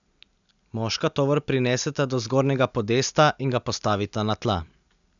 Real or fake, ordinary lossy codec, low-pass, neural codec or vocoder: real; none; 7.2 kHz; none